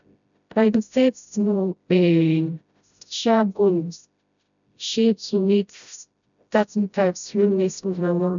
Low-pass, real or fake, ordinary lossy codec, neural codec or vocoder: 7.2 kHz; fake; none; codec, 16 kHz, 0.5 kbps, FreqCodec, smaller model